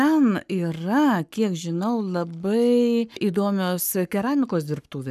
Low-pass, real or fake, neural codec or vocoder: 14.4 kHz; fake; codec, 44.1 kHz, 7.8 kbps, Pupu-Codec